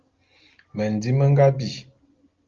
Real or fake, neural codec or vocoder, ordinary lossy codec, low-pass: real; none; Opus, 32 kbps; 7.2 kHz